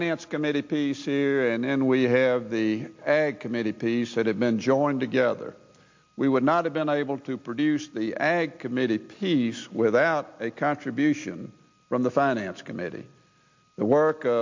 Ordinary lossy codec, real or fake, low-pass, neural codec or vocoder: MP3, 48 kbps; real; 7.2 kHz; none